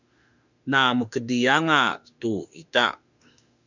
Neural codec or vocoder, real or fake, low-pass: autoencoder, 48 kHz, 32 numbers a frame, DAC-VAE, trained on Japanese speech; fake; 7.2 kHz